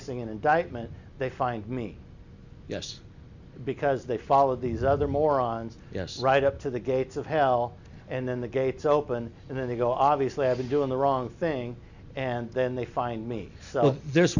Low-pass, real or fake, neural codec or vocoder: 7.2 kHz; real; none